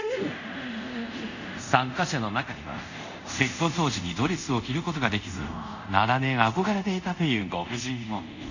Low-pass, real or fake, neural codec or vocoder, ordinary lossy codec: 7.2 kHz; fake; codec, 24 kHz, 0.5 kbps, DualCodec; none